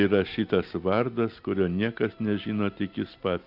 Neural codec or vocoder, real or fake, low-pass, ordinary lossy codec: none; real; 5.4 kHz; AAC, 48 kbps